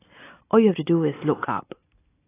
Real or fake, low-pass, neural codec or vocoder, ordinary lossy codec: real; 3.6 kHz; none; AAC, 16 kbps